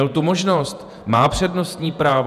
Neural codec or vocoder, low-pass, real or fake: vocoder, 48 kHz, 128 mel bands, Vocos; 14.4 kHz; fake